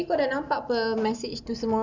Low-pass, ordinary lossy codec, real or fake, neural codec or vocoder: 7.2 kHz; none; real; none